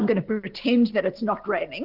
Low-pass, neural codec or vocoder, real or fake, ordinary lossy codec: 5.4 kHz; none; real; Opus, 16 kbps